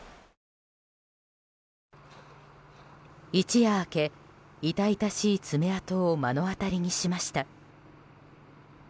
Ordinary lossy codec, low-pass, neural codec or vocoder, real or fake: none; none; none; real